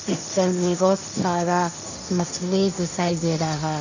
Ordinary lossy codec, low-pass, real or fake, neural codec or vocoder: none; 7.2 kHz; fake; codec, 16 kHz, 1.1 kbps, Voila-Tokenizer